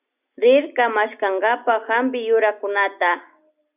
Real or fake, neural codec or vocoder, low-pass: real; none; 3.6 kHz